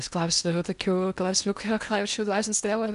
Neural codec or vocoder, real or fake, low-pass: codec, 16 kHz in and 24 kHz out, 0.8 kbps, FocalCodec, streaming, 65536 codes; fake; 10.8 kHz